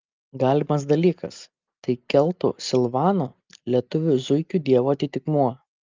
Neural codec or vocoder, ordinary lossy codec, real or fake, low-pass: none; Opus, 24 kbps; real; 7.2 kHz